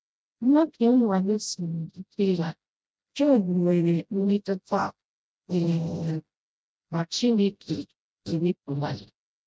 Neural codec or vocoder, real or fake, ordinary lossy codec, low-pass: codec, 16 kHz, 0.5 kbps, FreqCodec, smaller model; fake; none; none